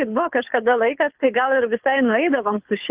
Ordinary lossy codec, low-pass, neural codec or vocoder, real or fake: Opus, 32 kbps; 3.6 kHz; codec, 24 kHz, 6 kbps, HILCodec; fake